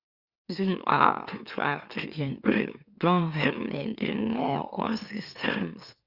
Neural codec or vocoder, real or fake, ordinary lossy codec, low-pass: autoencoder, 44.1 kHz, a latent of 192 numbers a frame, MeloTTS; fake; Opus, 64 kbps; 5.4 kHz